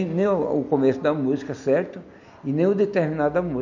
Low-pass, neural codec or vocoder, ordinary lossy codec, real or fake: 7.2 kHz; none; none; real